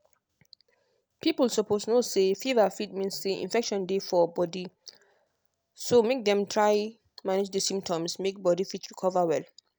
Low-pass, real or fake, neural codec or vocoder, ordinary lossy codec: none; real; none; none